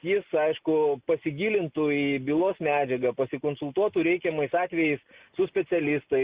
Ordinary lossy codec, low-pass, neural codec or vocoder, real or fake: Opus, 64 kbps; 3.6 kHz; none; real